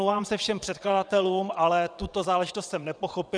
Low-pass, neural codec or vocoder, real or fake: 9.9 kHz; vocoder, 22.05 kHz, 80 mel bands, WaveNeXt; fake